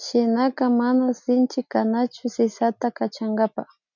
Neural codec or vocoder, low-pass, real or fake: none; 7.2 kHz; real